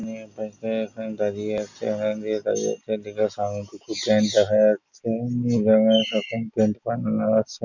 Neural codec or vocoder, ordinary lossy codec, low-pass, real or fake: none; none; 7.2 kHz; real